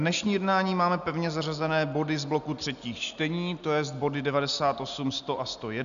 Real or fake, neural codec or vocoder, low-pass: real; none; 7.2 kHz